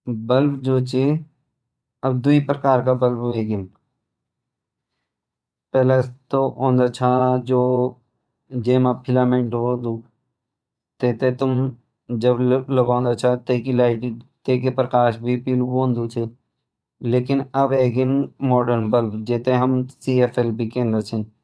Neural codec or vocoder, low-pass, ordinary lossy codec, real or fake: vocoder, 22.05 kHz, 80 mel bands, Vocos; none; none; fake